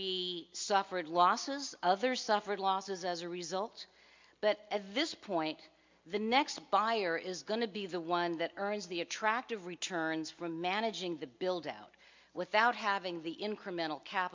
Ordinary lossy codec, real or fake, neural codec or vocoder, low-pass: MP3, 64 kbps; real; none; 7.2 kHz